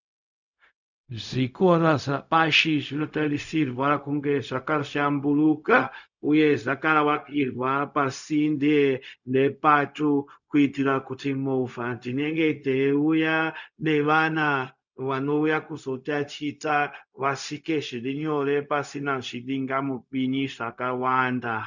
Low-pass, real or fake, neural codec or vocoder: 7.2 kHz; fake; codec, 16 kHz, 0.4 kbps, LongCat-Audio-Codec